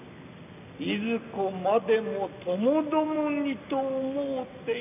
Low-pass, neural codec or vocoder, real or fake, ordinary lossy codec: 3.6 kHz; none; real; none